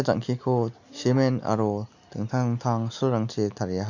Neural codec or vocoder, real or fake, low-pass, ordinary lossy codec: none; real; 7.2 kHz; none